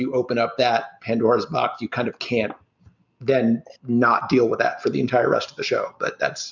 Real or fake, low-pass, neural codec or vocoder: real; 7.2 kHz; none